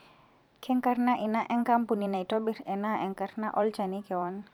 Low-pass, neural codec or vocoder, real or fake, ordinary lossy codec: 19.8 kHz; vocoder, 44.1 kHz, 128 mel bands every 512 samples, BigVGAN v2; fake; none